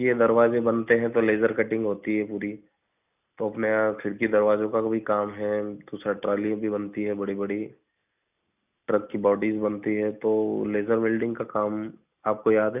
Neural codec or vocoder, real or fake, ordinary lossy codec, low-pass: none; real; none; 3.6 kHz